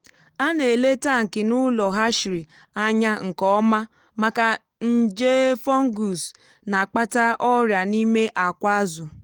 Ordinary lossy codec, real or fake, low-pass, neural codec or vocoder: Opus, 24 kbps; fake; 19.8 kHz; codec, 44.1 kHz, 7.8 kbps, DAC